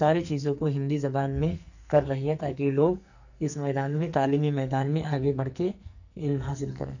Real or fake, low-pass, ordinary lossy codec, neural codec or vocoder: fake; 7.2 kHz; none; codec, 44.1 kHz, 2.6 kbps, SNAC